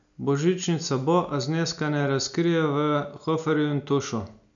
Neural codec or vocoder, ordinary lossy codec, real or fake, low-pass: none; none; real; 7.2 kHz